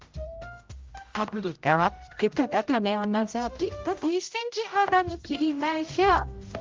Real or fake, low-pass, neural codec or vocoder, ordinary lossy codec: fake; 7.2 kHz; codec, 16 kHz, 0.5 kbps, X-Codec, HuBERT features, trained on general audio; Opus, 32 kbps